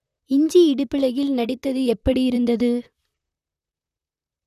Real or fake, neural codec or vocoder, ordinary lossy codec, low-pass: fake; vocoder, 44.1 kHz, 128 mel bands, Pupu-Vocoder; none; 14.4 kHz